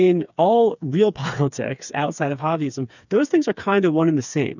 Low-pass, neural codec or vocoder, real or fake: 7.2 kHz; codec, 16 kHz, 4 kbps, FreqCodec, smaller model; fake